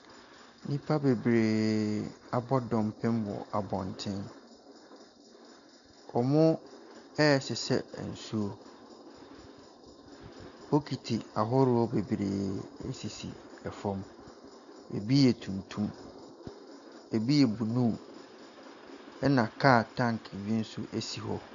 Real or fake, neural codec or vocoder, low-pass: real; none; 7.2 kHz